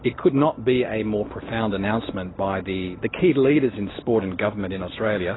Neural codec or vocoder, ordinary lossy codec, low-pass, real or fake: none; AAC, 16 kbps; 7.2 kHz; real